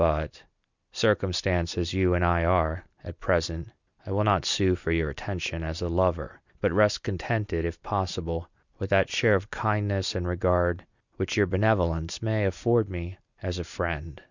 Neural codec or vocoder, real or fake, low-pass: none; real; 7.2 kHz